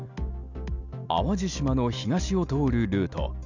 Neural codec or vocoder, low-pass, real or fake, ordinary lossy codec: none; 7.2 kHz; real; none